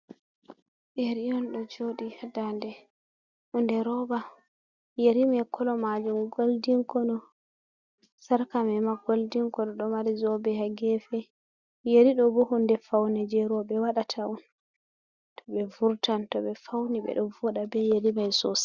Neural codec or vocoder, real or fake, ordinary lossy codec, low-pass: none; real; Opus, 64 kbps; 7.2 kHz